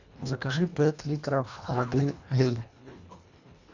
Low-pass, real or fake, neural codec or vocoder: 7.2 kHz; fake; codec, 24 kHz, 1.5 kbps, HILCodec